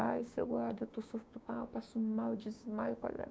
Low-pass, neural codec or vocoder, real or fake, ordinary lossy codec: none; codec, 16 kHz, 6 kbps, DAC; fake; none